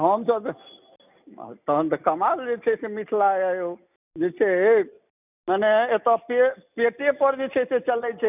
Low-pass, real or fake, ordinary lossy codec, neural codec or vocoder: 3.6 kHz; real; none; none